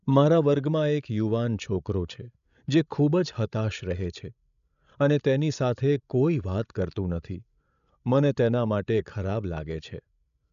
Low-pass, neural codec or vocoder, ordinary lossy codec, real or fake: 7.2 kHz; codec, 16 kHz, 16 kbps, FreqCodec, larger model; MP3, 96 kbps; fake